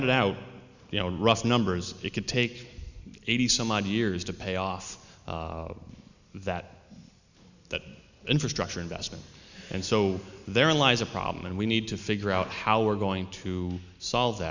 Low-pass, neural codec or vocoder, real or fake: 7.2 kHz; none; real